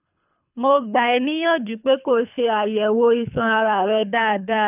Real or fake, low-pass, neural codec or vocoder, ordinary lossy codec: fake; 3.6 kHz; codec, 24 kHz, 3 kbps, HILCodec; none